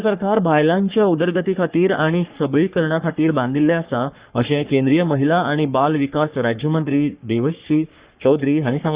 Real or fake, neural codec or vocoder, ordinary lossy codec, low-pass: fake; codec, 44.1 kHz, 3.4 kbps, Pupu-Codec; Opus, 64 kbps; 3.6 kHz